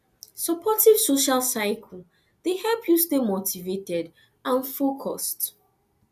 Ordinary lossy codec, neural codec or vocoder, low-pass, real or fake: none; none; 14.4 kHz; real